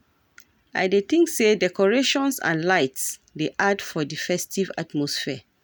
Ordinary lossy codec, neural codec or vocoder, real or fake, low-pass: none; none; real; none